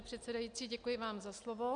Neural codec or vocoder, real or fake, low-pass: none; real; 9.9 kHz